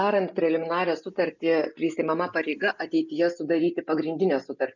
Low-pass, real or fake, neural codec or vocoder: 7.2 kHz; real; none